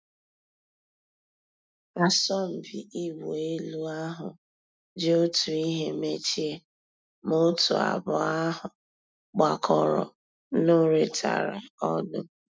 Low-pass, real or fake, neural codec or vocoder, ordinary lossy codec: none; real; none; none